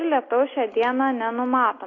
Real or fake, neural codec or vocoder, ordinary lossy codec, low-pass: real; none; AAC, 32 kbps; 7.2 kHz